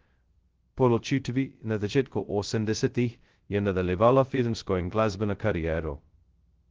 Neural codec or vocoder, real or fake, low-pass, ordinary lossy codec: codec, 16 kHz, 0.2 kbps, FocalCodec; fake; 7.2 kHz; Opus, 16 kbps